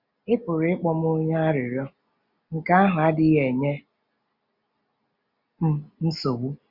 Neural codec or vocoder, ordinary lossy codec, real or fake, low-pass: none; Opus, 64 kbps; real; 5.4 kHz